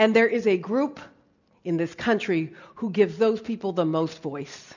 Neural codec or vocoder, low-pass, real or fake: none; 7.2 kHz; real